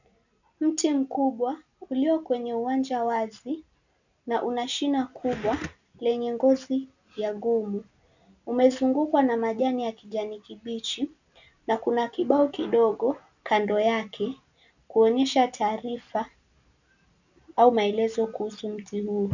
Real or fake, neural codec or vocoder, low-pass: real; none; 7.2 kHz